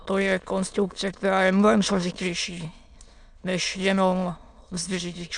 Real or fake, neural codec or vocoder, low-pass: fake; autoencoder, 22.05 kHz, a latent of 192 numbers a frame, VITS, trained on many speakers; 9.9 kHz